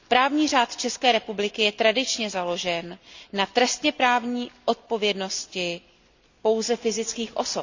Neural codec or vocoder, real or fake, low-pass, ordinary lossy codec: none; real; 7.2 kHz; Opus, 64 kbps